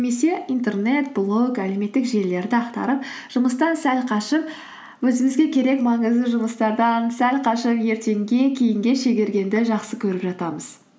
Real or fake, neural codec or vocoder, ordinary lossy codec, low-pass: real; none; none; none